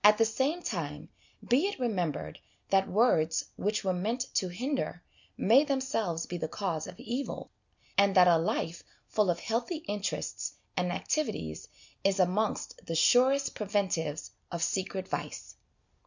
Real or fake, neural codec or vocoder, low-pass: real; none; 7.2 kHz